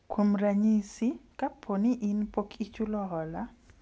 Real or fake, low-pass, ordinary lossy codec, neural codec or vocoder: real; none; none; none